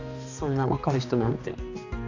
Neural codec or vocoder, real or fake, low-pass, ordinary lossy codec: codec, 16 kHz, 2 kbps, X-Codec, HuBERT features, trained on balanced general audio; fake; 7.2 kHz; none